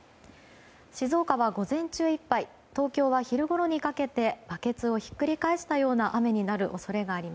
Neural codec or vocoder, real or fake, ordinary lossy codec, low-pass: none; real; none; none